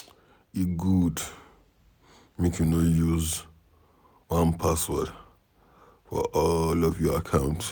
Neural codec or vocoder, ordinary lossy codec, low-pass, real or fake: none; none; none; real